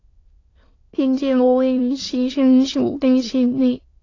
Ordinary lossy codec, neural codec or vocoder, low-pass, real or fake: AAC, 32 kbps; autoencoder, 22.05 kHz, a latent of 192 numbers a frame, VITS, trained on many speakers; 7.2 kHz; fake